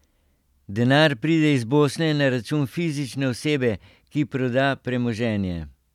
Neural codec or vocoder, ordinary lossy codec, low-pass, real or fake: none; none; 19.8 kHz; real